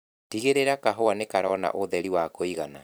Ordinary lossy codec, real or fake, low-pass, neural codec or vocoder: none; fake; none; vocoder, 44.1 kHz, 128 mel bands every 256 samples, BigVGAN v2